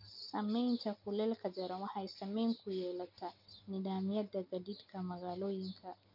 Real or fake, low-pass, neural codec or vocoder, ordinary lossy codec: real; 5.4 kHz; none; none